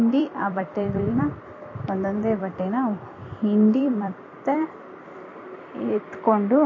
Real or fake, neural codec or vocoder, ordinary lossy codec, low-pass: fake; vocoder, 44.1 kHz, 128 mel bands every 256 samples, BigVGAN v2; MP3, 32 kbps; 7.2 kHz